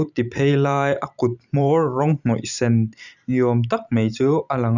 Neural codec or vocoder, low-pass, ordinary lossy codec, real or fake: vocoder, 44.1 kHz, 128 mel bands every 512 samples, BigVGAN v2; 7.2 kHz; none; fake